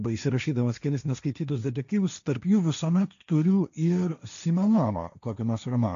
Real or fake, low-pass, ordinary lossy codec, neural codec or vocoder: fake; 7.2 kHz; AAC, 96 kbps; codec, 16 kHz, 1.1 kbps, Voila-Tokenizer